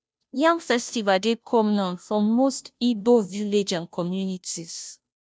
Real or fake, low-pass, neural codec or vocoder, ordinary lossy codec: fake; none; codec, 16 kHz, 0.5 kbps, FunCodec, trained on Chinese and English, 25 frames a second; none